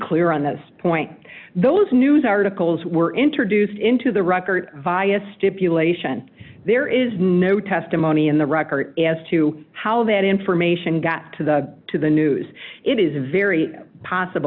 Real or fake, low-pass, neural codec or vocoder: real; 5.4 kHz; none